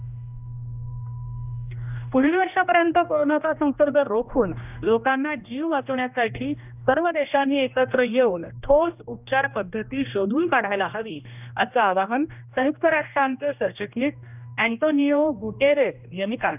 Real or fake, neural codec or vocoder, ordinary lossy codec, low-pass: fake; codec, 16 kHz, 1 kbps, X-Codec, HuBERT features, trained on general audio; none; 3.6 kHz